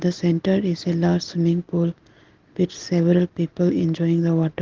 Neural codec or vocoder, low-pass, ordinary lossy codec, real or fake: none; 7.2 kHz; Opus, 16 kbps; real